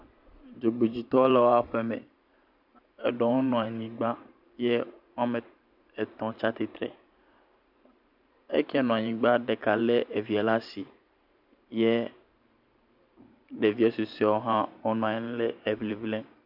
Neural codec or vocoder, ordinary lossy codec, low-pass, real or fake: vocoder, 44.1 kHz, 128 mel bands every 512 samples, BigVGAN v2; MP3, 48 kbps; 5.4 kHz; fake